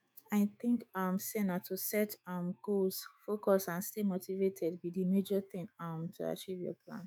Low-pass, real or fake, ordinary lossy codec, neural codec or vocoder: none; fake; none; autoencoder, 48 kHz, 128 numbers a frame, DAC-VAE, trained on Japanese speech